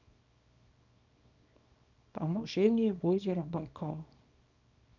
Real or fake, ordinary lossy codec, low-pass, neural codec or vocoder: fake; none; 7.2 kHz; codec, 24 kHz, 0.9 kbps, WavTokenizer, small release